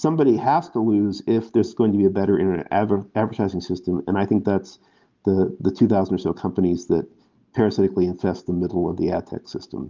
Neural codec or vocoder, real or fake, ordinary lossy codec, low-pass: none; real; Opus, 32 kbps; 7.2 kHz